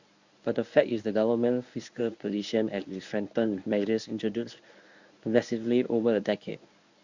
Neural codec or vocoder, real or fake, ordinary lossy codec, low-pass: codec, 24 kHz, 0.9 kbps, WavTokenizer, medium speech release version 1; fake; Opus, 64 kbps; 7.2 kHz